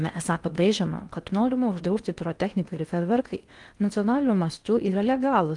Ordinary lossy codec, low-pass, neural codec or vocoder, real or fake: Opus, 32 kbps; 10.8 kHz; codec, 16 kHz in and 24 kHz out, 0.6 kbps, FocalCodec, streaming, 4096 codes; fake